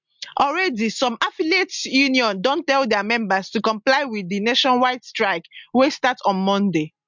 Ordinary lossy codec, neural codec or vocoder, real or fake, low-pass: MP3, 64 kbps; none; real; 7.2 kHz